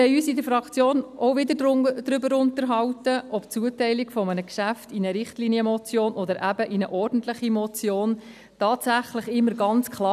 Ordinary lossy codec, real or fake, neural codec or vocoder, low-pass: none; real; none; 14.4 kHz